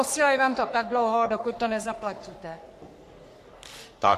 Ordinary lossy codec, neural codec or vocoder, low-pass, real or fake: AAC, 64 kbps; codec, 44.1 kHz, 3.4 kbps, Pupu-Codec; 14.4 kHz; fake